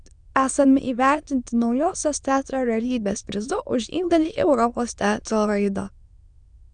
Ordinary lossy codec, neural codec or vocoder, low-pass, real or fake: Opus, 64 kbps; autoencoder, 22.05 kHz, a latent of 192 numbers a frame, VITS, trained on many speakers; 9.9 kHz; fake